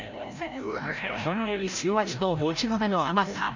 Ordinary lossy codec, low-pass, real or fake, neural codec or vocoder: MP3, 64 kbps; 7.2 kHz; fake; codec, 16 kHz, 0.5 kbps, FreqCodec, larger model